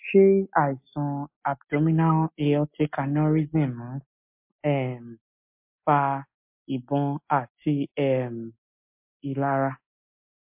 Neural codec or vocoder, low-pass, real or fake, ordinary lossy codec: none; 3.6 kHz; real; MP3, 32 kbps